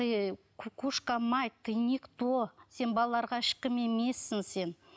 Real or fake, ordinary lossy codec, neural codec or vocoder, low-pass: real; none; none; none